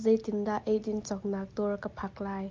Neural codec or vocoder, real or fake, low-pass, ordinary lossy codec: none; real; 7.2 kHz; Opus, 24 kbps